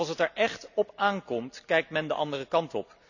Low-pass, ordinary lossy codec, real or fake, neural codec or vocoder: 7.2 kHz; none; real; none